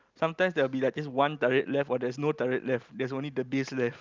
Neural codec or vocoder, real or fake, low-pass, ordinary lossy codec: none; real; 7.2 kHz; Opus, 24 kbps